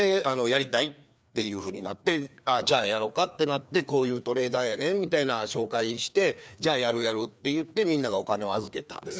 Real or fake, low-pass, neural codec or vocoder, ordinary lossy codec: fake; none; codec, 16 kHz, 2 kbps, FreqCodec, larger model; none